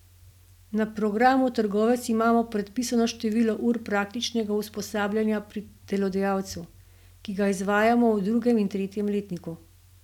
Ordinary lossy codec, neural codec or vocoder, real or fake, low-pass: none; none; real; 19.8 kHz